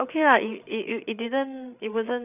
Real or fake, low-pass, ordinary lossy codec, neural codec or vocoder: fake; 3.6 kHz; none; codec, 16 kHz, 8 kbps, FreqCodec, larger model